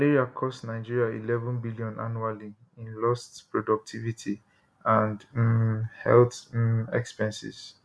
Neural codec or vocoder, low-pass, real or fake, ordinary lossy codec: none; none; real; none